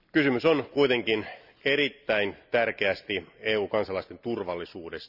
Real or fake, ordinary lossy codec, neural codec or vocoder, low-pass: real; none; none; 5.4 kHz